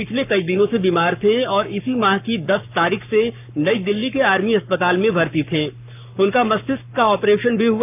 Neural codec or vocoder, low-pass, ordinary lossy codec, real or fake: codec, 44.1 kHz, 7.8 kbps, Pupu-Codec; 3.6 kHz; none; fake